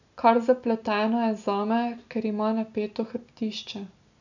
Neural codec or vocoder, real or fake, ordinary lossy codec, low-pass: none; real; none; 7.2 kHz